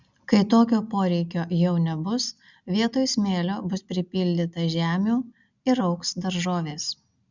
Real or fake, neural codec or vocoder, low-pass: real; none; 7.2 kHz